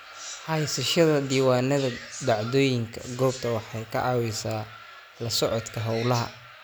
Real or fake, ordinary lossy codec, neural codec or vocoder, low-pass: real; none; none; none